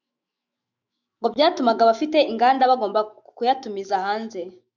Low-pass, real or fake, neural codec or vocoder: 7.2 kHz; fake; autoencoder, 48 kHz, 128 numbers a frame, DAC-VAE, trained on Japanese speech